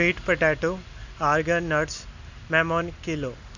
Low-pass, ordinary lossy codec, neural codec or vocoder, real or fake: 7.2 kHz; none; none; real